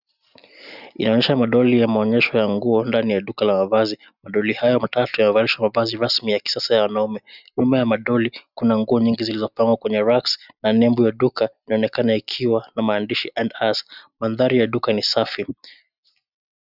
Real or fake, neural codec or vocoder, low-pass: real; none; 5.4 kHz